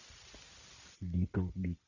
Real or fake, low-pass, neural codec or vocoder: real; 7.2 kHz; none